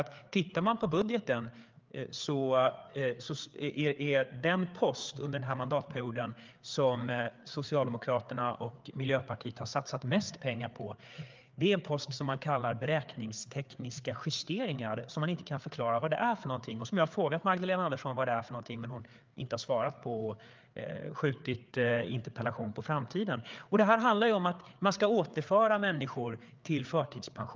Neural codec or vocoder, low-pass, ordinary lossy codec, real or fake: codec, 16 kHz, 4 kbps, FreqCodec, larger model; 7.2 kHz; Opus, 24 kbps; fake